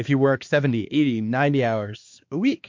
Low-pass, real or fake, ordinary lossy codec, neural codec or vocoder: 7.2 kHz; fake; MP3, 48 kbps; codec, 16 kHz, 2 kbps, X-Codec, HuBERT features, trained on balanced general audio